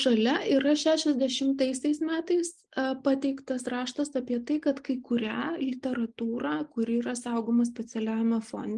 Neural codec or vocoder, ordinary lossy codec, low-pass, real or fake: none; Opus, 32 kbps; 10.8 kHz; real